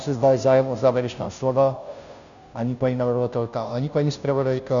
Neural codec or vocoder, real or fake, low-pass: codec, 16 kHz, 0.5 kbps, FunCodec, trained on Chinese and English, 25 frames a second; fake; 7.2 kHz